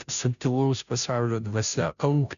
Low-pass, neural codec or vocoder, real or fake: 7.2 kHz; codec, 16 kHz, 0.5 kbps, FunCodec, trained on Chinese and English, 25 frames a second; fake